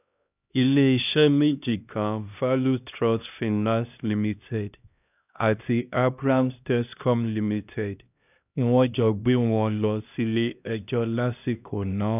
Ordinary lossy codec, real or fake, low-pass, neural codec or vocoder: none; fake; 3.6 kHz; codec, 16 kHz, 1 kbps, X-Codec, HuBERT features, trained on LibriSpeech